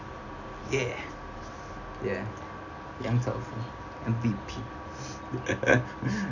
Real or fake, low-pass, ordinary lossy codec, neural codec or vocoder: real; 7.2 kHz; none; none